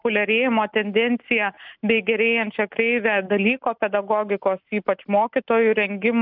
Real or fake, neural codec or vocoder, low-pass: real; none; 5.4 kHz